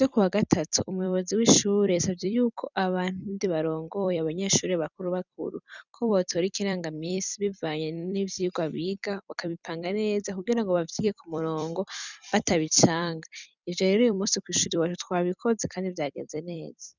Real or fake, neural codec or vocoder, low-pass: fake; vocoder, 44.1 kHz, 128 mel bands every 256 samples, BigVGAN v2; 7.2 kHz